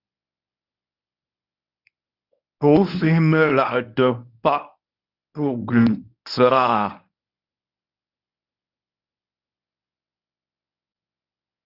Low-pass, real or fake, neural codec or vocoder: 5.4 kHz; fake; codec, 24 kHz, 0.9 kbps, WavTokenizer, medium speech release version 1